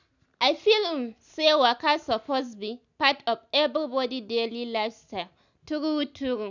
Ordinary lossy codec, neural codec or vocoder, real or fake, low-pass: none; none; real; 7.2 kHz